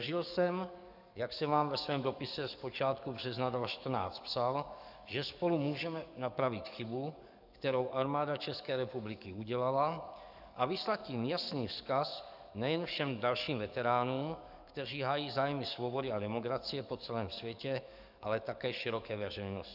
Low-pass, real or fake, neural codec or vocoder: 5.4 kHz; fake; codec, 44.1 kHz, 7.8 kbps, DAC